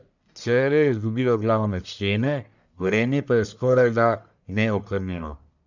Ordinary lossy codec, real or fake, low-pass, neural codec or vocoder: none; fake; 7.2 kHz; codec, 44.1 kHz, 1.7 kbps, Pupu-Codec